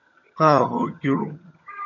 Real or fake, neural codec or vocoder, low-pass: fake; vocoder, 22.05 kHz, 80 mel bands, HiFi-GAN; 7.2 kHz